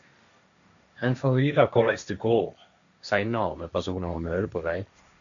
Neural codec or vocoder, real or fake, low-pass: codec, 16 kHz, 1.1 kbps, Voila-Tokenizer; fake; 7.2 kHz